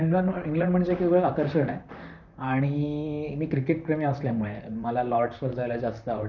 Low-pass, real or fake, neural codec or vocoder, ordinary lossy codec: 7.2 kHz; fake; vocoder, 44.1 kHz, 128 mel bands, Pupu-Vocoder; none